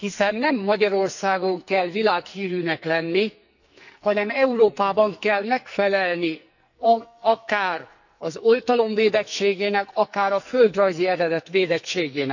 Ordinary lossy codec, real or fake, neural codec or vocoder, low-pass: none; fake; codec, 44.1 kHz, 2.6 kbps, SNAC; 7.2 kHz